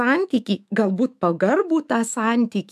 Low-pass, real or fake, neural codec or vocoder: 14.4 kHz; fake; autoencoder, 48 kHz, 128 numbers a frame, DAC-VAE, trained on Japanese speech